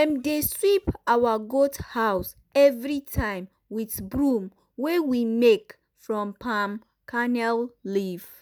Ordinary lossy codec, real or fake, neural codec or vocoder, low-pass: none; real; none; none